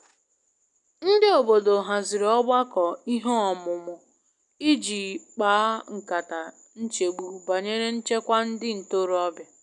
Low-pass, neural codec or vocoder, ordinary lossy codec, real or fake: 10.8 kHz; none; none; real